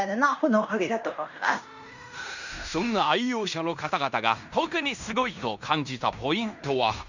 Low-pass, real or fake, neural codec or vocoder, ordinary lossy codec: 7.2 kHz; fake; codec, 16 kHz in and 24 kHz out, 0.9 kbps, LongCat-Audio-Codec, fine tuned four codebook decoder; none